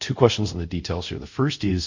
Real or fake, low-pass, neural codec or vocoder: fake; 7.2 kHz; codec, 24 kHz, 0.5 kbps, DualCodec